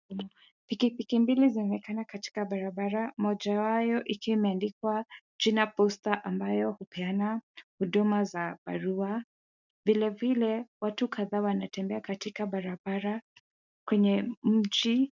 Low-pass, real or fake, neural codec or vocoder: 7.2 kHz; real; none